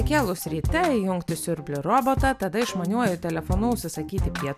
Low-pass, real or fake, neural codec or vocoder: 14.4 kHz; real; none